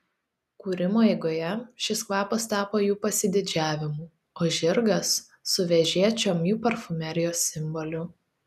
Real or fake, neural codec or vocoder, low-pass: real; none; 14.4 kHz